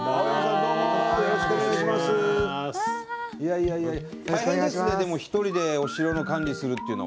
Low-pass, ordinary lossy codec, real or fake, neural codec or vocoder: none; none; real; none